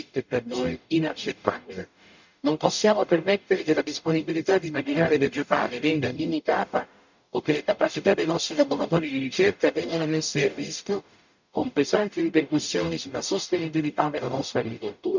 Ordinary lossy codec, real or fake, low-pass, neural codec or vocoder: none; fake; 7.2 kHz; codec, 44.1 kHz, 0.9 kbps, DAC